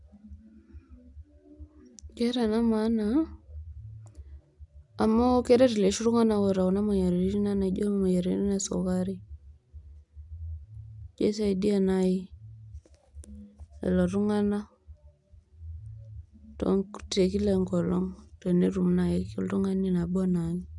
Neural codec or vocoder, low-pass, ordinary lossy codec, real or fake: none; 10.8 kHz; none; real